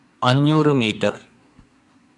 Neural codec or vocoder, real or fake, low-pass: codec, 24 kHz, 1 kbps, SNAC; fake; 10.8 kHz